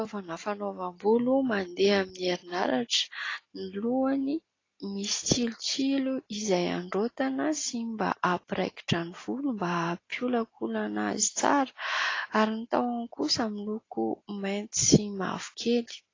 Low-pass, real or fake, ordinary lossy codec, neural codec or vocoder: 7.2 kHz; real; AAC, 32 kbps; none